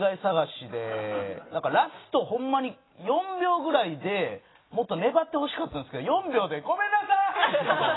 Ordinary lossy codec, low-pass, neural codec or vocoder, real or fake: AAC, 16 kbps; 7.2 kHz; none; real